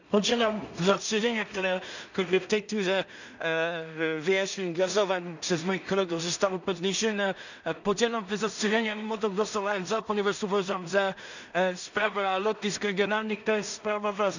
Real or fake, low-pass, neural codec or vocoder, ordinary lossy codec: fake; 7.2 kHz; codec, 16 kHz in and 24 kHz out, 0.4 kbps, LongCat-Audio-Codec, two codebook decoder; none